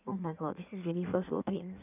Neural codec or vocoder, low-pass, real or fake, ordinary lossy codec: codec, 16 kHz in and 24 kHz out, 1.1 kbps, FireRedTTS-2 codec; 3.6 kHz; fake; none